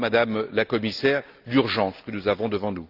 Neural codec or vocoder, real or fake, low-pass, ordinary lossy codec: none; real; 5.4 kHz; Opus, 24 kbps